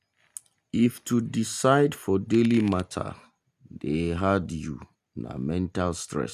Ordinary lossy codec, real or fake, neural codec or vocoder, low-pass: none; real; none; 14.4 kHz